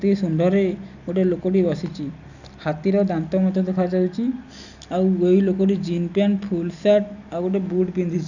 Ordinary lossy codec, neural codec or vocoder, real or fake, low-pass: none; none; real; 7.2 kHz